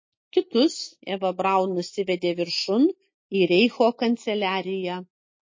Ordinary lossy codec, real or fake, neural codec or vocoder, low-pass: MP3, 32 kbps; real; none; 7.2 kHz